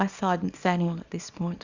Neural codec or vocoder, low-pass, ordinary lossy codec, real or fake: codec, 24 kHz, 0.9 kbps, WavTokenizer, small release; 7.2 kHz; Opus, 64 kbps; fake